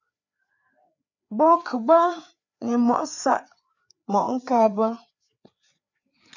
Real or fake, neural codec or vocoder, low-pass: fake; codec, 16 kHz, 4 kbps, FreqCodec, larger model; 7.2 kHz